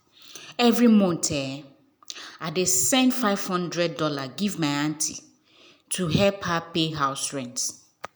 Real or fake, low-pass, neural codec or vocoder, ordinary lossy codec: real; none; none; none